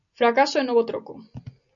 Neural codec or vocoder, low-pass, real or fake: none; 7.2 kHz; real